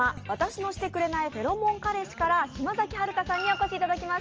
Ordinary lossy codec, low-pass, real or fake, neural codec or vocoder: Opus, 24 kbps; 7.2 kHz; real; none